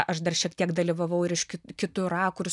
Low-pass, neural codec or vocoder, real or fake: 10.8 kHz; none; real